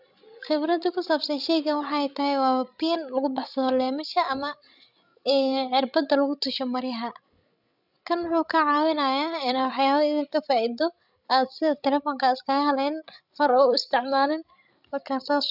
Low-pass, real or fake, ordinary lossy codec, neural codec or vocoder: 5.4 kHz; fake; none; codec, 16 kHz, 16 kbps, FreqCodec, larger model